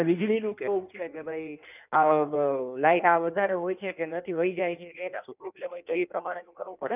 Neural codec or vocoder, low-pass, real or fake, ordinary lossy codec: codec, 16 kHz in and 24 kHz out, 1.1 kbps, FireRedTTS-2 codec; 3.6 kHz; fake; none